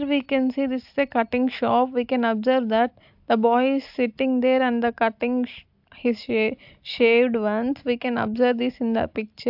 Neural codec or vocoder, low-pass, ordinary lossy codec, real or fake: none; 5.4 kHz; AAC, 48 kbps; real